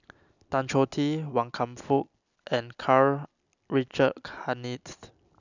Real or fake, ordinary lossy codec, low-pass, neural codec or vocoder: real; none; 7.2 kHz; none